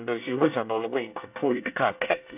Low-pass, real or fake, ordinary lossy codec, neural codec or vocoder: 3.6 kHz; fake; none; codec, 24 kHz, 1 kbps, SNAC